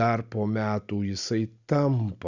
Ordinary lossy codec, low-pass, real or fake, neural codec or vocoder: Opus, 64 kbps; 7.2 kHz; real; none